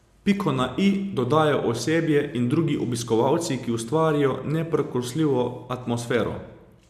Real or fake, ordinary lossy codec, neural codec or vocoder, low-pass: real; MP3, 96 kbps; none; 14.4 kHz